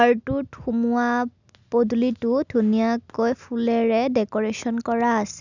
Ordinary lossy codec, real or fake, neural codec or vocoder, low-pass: none; real; none; 7.2 kHz